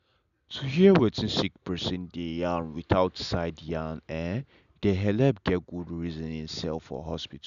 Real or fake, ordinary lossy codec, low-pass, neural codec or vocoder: real; none; 7.2 kHz; none